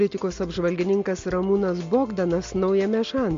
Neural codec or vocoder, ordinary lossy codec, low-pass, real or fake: none; MP3, 48 kbps; 7.2 kHz; real